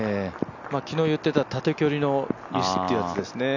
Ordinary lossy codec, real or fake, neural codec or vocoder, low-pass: none; real; none; 7.2 kHz